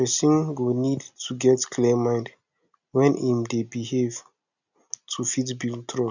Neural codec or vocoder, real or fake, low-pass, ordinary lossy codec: none; real; 7.2 kHz; none